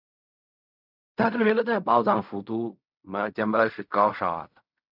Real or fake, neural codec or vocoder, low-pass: fake; codec, 16 kHz in and 24 kHz out, 0.4 kbps, LongCat-Audio-Codec, fine tuned four codebook decoder; 5.4 kHz